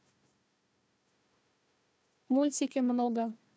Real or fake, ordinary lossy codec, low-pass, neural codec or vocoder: fake; none; none; codec, 16 kHz, 1 kbps, FunCodec, trained on Chinese and English, 50 frames a second